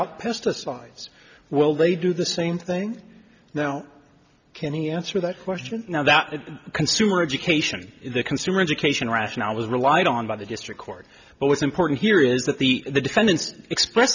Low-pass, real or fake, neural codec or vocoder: 7.2 kHz; real; none